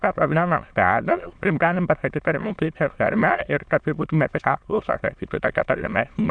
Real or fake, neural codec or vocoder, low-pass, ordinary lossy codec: fake; autoencoder, 22.05 kHz, a latent of 192 numbers a frame, VITS, trained on many speakers; 9.9 kHz; Opus, 64 kbps